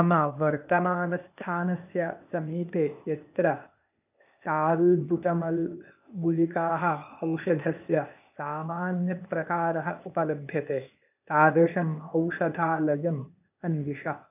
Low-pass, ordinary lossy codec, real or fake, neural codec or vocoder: 3.6 kHz; none; fake; codec, 16 kHz, 0.8 kbps, ZipCodec